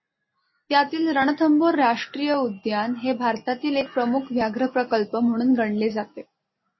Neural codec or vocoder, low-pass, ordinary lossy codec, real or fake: none; 7.2 kHz; MP3, 24 kbps; real